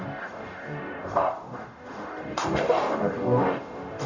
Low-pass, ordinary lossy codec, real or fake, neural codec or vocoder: 7.2 kHz; none; fake; codec, 44.1 kHz, 0.9 kbps, DAC